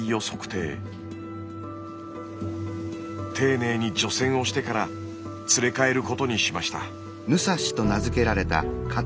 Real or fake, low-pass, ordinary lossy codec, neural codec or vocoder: real; none; none; none